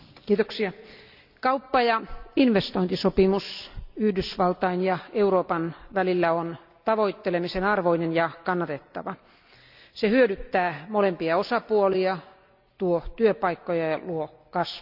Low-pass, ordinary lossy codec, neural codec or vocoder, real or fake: 5.4 kHz; none; none; real